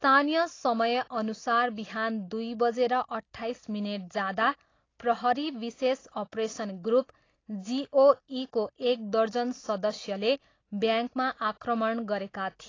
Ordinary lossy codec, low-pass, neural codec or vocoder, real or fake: AAC, 32 kbps; 7.2 kHz; none; real